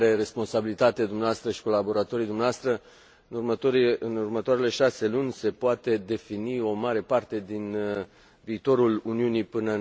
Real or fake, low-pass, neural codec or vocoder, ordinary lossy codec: real; none; none; none